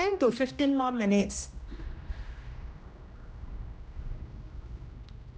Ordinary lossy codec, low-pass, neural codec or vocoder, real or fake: none; none; codec, 16 kHz, 1 kbps, X-Codec, HuBERT features, trained on general audio; fake